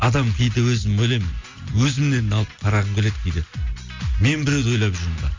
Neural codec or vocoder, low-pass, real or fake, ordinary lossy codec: none; 7.2 kHz; real; MP3, 48 kbps